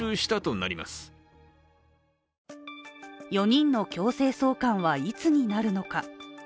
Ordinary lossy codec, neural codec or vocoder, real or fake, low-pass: none; none; real; none